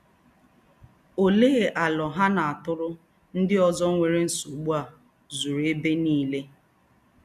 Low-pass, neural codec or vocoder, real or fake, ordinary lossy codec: 14.4 kHz; none; real; none